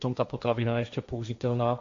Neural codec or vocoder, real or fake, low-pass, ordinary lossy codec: codec, 16 kHz, 1.1 kbps, Voila-Tokenizer; fake; 7.2 kHz; AAC, 48 kbps